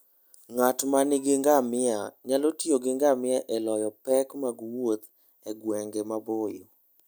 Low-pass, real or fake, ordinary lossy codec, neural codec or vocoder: none; real; none; none